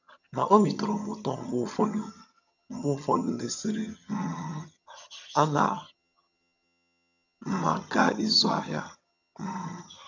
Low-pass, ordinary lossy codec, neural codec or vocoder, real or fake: 7.2 kHz; none; vocoder, 22.05 kHz, 80 mel bands, HiFi-GAN; fake